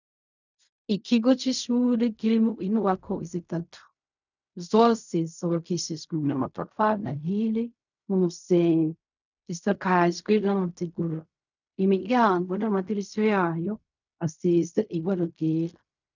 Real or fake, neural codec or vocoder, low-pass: fake; codec, 16 kHz in and 24 kHz out, 0.4 kbps, LongCat-Audio-Codec, fine tuned four codebook decoder; 7.2 kHz